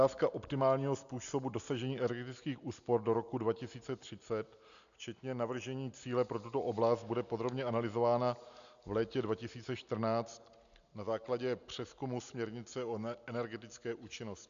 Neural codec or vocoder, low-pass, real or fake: none; 7.2 kHz; real